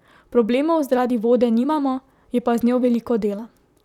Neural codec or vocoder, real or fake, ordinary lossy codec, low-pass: vocoder, 44.1 kHz, 128 mel bands, Pupu-Vocoder; fake; none; 19.8 kHz